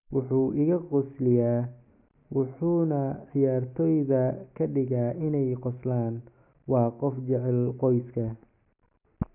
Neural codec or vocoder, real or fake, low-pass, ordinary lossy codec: none; real; 3.6 kHz; none